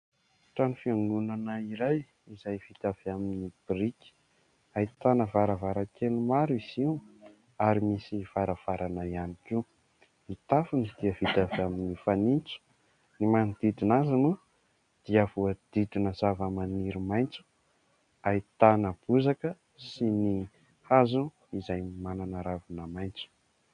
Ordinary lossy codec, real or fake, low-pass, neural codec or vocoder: AAC, 96 kbps; real; 9.9 kHz; none